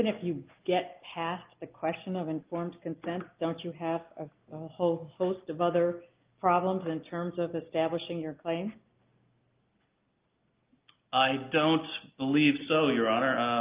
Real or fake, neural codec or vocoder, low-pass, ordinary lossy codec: real; none; 3.6 kHz; Opus, 32 kbps